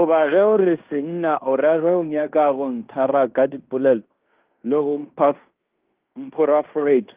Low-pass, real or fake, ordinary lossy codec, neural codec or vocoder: 3.6 kHz; fake; Opus, 32 kbps; codec, 16 kHz in and 24 kHz out, 0.9 kbps, LongCat-Audio-Codec, fine tuned four codebook decoder